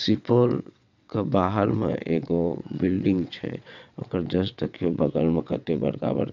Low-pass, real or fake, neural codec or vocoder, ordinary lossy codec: 7.2 kHz; fake; vocoder, 22.05 kHz, 80 mel bands, WaveNeXt; none